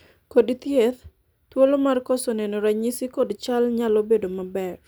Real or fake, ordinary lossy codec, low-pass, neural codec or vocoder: real; none; none; none